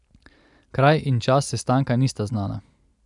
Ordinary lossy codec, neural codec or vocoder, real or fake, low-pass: none; none; real; 10.8 kHz